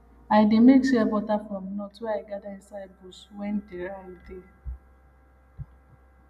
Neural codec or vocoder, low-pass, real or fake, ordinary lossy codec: none; 14.4 kHz; real; none